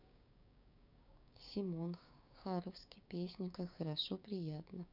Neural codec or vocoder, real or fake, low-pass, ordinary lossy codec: codec, 16 kHz, 6 kbps, DAC; fake; 5.4 kHz; none